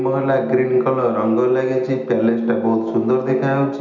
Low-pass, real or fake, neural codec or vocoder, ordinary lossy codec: 7.2 kHz; real; none; none